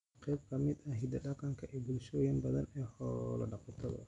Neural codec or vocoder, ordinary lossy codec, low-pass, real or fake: none; none; 10.8 kHz; real